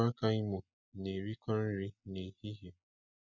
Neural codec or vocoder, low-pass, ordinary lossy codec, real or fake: none; 7.2 kHz; none; real